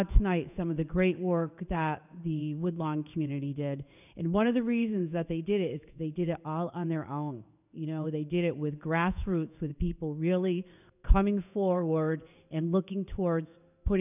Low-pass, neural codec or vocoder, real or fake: 3.6 kHz; codec, 16 kHz in and 24 kHz out, 1 kbps, XY-Tokenizer; fake